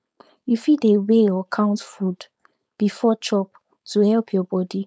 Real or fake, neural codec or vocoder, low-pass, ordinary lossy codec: fake; codec, 16 kHz, 4.8 kbps, FACodec; none; none